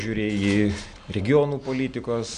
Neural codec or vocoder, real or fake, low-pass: none; real; 9.9 kHz